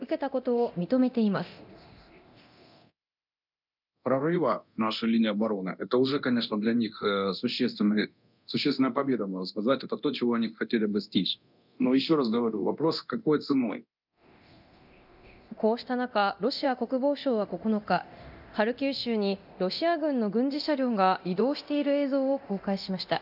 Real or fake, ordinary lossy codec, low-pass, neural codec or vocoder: fake; none; 5.4 kHz; codec, 24 kHz, 0.9 kbps, DualCodec